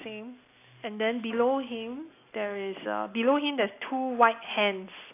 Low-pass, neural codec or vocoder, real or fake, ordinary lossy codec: 3.6 kHz; none; real; AAC, 24 kbps